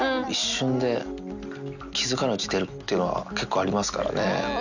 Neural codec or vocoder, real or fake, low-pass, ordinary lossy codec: none; real; 7.2 kHz; none